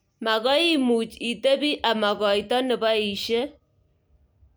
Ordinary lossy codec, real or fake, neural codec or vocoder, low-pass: none; real; none; none